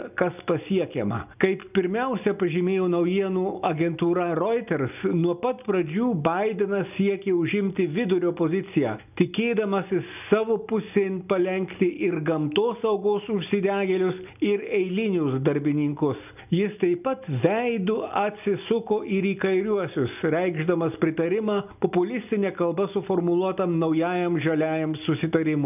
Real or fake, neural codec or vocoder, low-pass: real; none; 3.6 kHz